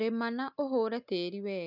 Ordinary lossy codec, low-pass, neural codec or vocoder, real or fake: none; 5.4 kHz; none; real